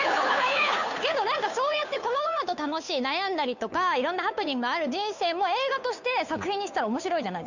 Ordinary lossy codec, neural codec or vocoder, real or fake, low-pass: none; codec, 16 kHz, 2 kbps, FunCodec, trained on Chinese and English, 25 frames a second; fake; 7.2 kHz